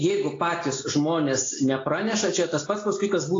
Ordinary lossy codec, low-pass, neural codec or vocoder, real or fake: AAC, 32 kbps; 7.2 kHz; none; real